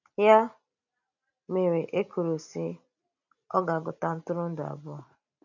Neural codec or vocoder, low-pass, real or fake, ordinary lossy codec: none; 7.2 kHz; real; none